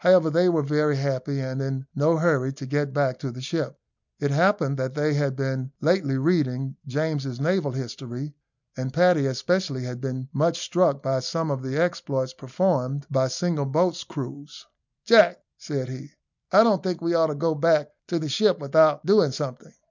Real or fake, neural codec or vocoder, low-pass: real; none; 7.2 kHz